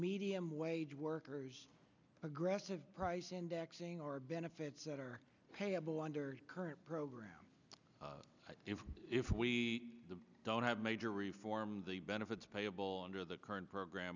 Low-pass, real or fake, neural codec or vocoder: 7.2 kHz; real; none